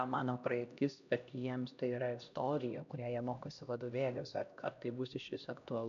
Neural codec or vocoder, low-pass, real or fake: codec, 16 kHz, 1 kbps, X-Codec, HuBERT features, trained on LibriSpeech; 7.2 kHz; fake